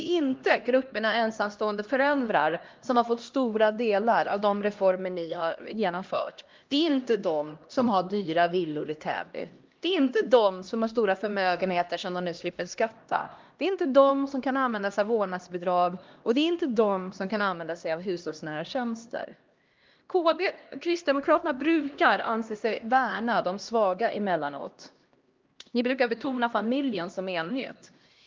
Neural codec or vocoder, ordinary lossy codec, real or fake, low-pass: codec, 16 kHz, 1 kbps, X-Codec, HuBERT features, trained on LibriSpeech; Opus, 32 kbps; fake; 7.2 kHz